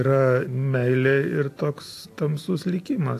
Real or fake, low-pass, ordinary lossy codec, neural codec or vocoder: real; 14.4 kHz; AAC, 64 kbps; none